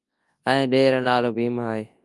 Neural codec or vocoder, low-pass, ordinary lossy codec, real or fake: codec, 24 kHz, 0.9 kbps, WavTokenizer, large speech release; 10.8 kHz; Opus, 32 kbps; fake